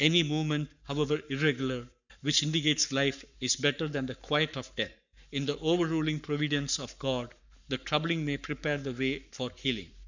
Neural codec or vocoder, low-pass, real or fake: codec, 44.1 kHz, 7.8 kbps, Pupu-Codec; 7.2 kHz; fake